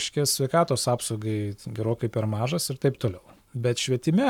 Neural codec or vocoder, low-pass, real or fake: codec, 44.1 kHz, 7.8 kbps, Pupu-Codec; 19.8 kHz; fake